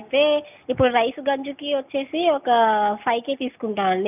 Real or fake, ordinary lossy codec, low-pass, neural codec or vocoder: real; none; 3.6 kHz; none